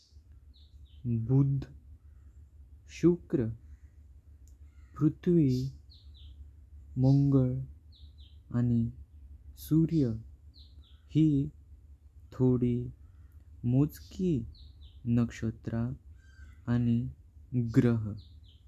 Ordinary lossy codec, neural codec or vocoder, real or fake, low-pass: none; none; real; 14.4 kHz